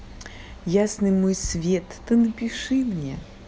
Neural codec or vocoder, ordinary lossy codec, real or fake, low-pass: none; none; real; none